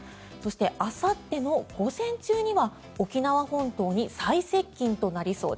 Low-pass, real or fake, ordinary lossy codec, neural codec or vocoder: none; real; none; none